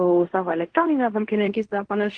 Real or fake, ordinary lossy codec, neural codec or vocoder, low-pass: fake; Opus, 24 kbps; codec, 16 kHz in and 24 kHz out, 0.4 kbps, LongCat-Audio-Codec, fine tuned four codebook decoder; 9.9 kHz